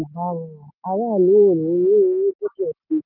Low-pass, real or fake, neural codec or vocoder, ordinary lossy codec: 5.4 kHz; real; none; none